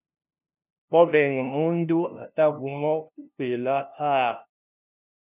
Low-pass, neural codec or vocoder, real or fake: 3.6 kHz; codec, 16 kHz, 0.5 kbps, FunCodec, trained on LibriTTS, 25 frames a second; fake